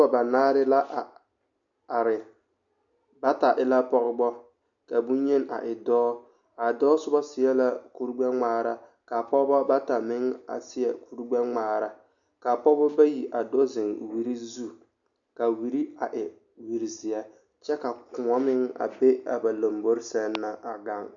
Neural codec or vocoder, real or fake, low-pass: none; real; 7.2 kHz